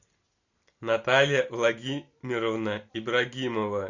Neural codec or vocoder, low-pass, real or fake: none; 7.2 kHz; real